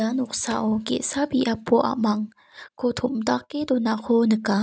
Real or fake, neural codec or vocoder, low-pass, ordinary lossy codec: real; none; none; none